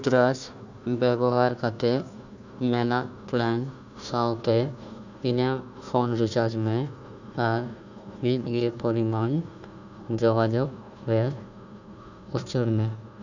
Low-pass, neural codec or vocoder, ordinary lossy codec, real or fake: 7.2 kHz; codec, 16 kHz, 1 kbps, FunCodec, trained on Chinese and English, 50 frames a second; none; fake